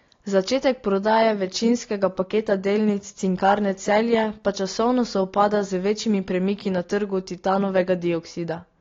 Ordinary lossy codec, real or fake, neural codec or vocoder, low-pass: AAC, 32 kbps; real; none; 7.2 kHz